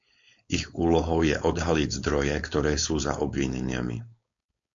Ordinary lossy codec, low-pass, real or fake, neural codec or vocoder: MP3, 48 kbps; 7.2 kHz; fake; codec, 16 kHz, 4.8 kbps, FACodec